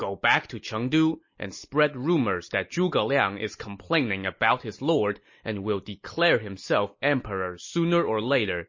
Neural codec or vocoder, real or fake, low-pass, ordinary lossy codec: none; real; 7.2 kHz; MP3, 32 kbps